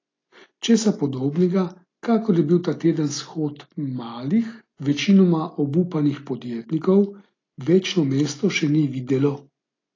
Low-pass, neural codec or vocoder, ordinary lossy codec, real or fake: 7.2 kHz; none; AAC, 32 kbps; real